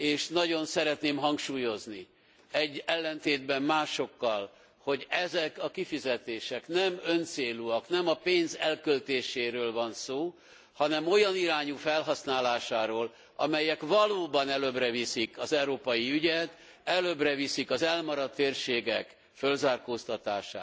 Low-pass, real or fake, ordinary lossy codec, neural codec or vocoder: none; real; none; none